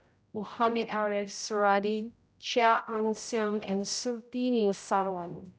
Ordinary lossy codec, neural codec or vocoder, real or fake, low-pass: none; codec, 16 kHz, 0.5 kbps, X-Codec, HuBERT features, trained on general audio; fake; none